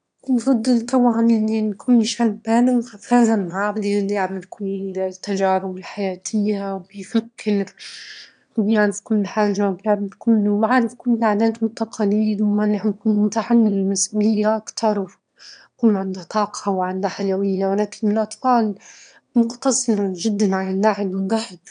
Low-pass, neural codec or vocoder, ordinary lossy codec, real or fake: 9.9 kHz; autoencoder, 22.05 kHz, a latent of 192 numbers a frame, VITS, trained on one speaker; none; fake